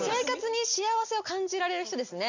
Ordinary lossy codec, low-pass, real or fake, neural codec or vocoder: none; 7.2 kHz; real; none